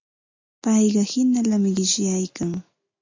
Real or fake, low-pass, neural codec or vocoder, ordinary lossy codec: real; 7.2 kHz; none; AAC, 48 kbps